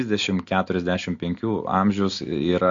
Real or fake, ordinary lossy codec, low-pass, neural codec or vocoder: real; MP3, 48 kbps; 7.2 kHz; none